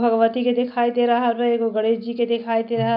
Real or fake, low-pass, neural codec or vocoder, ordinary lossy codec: real; 5.4 kHz; none; MP3, 48 kbps